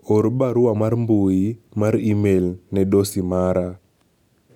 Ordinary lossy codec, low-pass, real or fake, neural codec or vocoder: none; 19.8 kHz; real; none